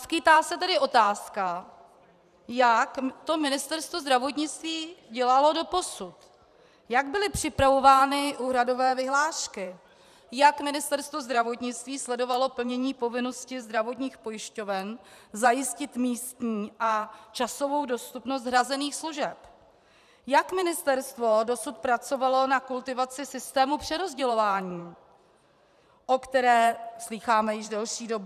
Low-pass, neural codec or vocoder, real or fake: 14.4 kHz; vocoder, 44.1 kHz, 128 mel bands every 512 samples, BigVGAN v2; fake